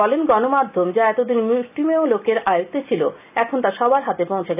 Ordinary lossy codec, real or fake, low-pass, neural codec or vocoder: none; real; 3.6 kHz; none